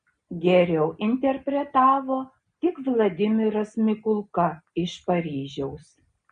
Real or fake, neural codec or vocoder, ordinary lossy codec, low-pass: real; none; AAC, 96 kbps; 9.9 kHz